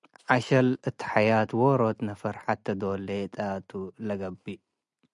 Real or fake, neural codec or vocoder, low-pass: real; none; 10.8 kHz